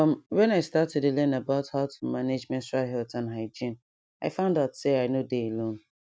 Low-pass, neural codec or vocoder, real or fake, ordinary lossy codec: none; none; real; none